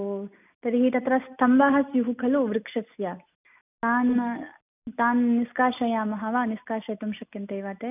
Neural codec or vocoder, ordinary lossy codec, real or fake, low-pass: none; none; real; 3.6 kHz